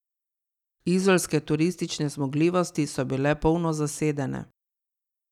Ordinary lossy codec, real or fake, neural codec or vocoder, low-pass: none; real; none; 19.8 kHz